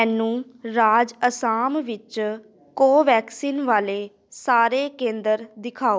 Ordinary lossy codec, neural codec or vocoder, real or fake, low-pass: none; none; real; none